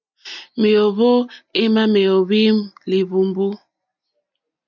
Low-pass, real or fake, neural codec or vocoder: 7.2 kHz; real; none